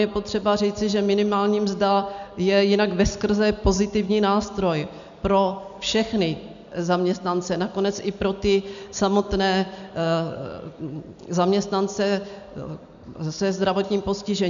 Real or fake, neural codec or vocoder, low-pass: real; none; 7.2 kHz